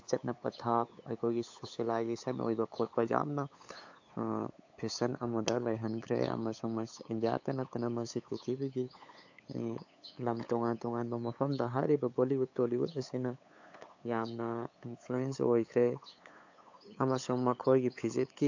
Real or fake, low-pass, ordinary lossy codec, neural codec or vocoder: fake; 7.2 kHz; AAC, 48 kbps; codec, 16 kHz, 8 kbps, FunCodec, trained on LibriTTS, 25 frames a second